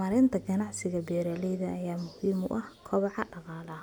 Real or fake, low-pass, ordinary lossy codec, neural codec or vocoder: real; none; none; none